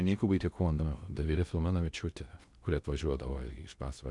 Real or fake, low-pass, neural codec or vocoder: fake; 10.8 kHz; codec, 16 kHz in and 24 kHz out, 0.6 kbps, FocalCodec, streaming, 2048 codes